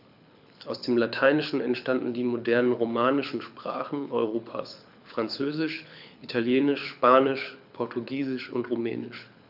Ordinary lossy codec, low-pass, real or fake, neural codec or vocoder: none; 5.4 kHz; fake; codec, 24 kHz, 6 kbps, HILCodec